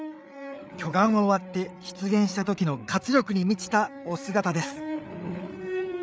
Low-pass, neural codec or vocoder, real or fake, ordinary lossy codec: none; codec, 16 kHz, 8 kbps, FreqCodec, larger model; fake; none